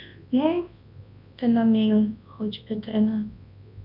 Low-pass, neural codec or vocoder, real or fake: 5.4 kHz; codec, 24 kHz, 0.9 kbps, WavTokenizer, large speech release; fake